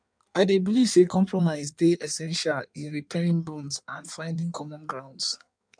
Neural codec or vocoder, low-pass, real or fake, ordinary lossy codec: codec, 16 kHz in and 24 kHz out, 1.1 kbps, FireRedTTS-2 codec; 9.9 kHz; fake; none